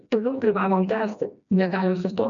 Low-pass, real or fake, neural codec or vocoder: 7.2 kHz; fake; codec, 16 kHz, 1 kbps, FreqCodec, smaller model